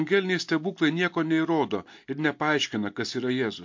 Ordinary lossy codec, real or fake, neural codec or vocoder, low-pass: MP3, 48 kbps; real; none; 7.2 kHz